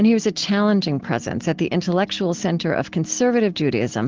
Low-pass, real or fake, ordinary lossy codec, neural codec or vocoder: 7.2 kHz; real; Opus, 16 kbps; none